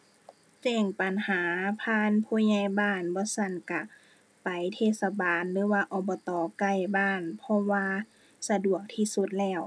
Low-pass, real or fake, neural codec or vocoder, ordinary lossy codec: none; real; none; none